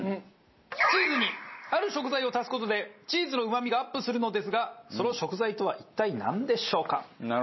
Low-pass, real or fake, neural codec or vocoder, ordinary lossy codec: 7.2 kHz; real; none; MP3, 24 kbps